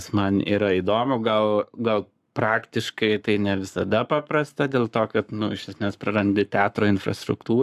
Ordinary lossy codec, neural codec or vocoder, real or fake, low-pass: AAC, 96 kbps; codec, 44.1 kHz, 7.8 kbps, Pupu-Codec; fake; 14.4 kHz